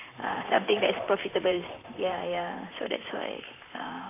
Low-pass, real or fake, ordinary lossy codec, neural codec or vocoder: 3.6 kHz; fake; AAC, 24 kbps; vocoder, 44.1 kHz, 128 mel bands, Pupu-Vocoder